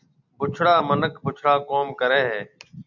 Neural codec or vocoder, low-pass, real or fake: none; 7.2 kHz; real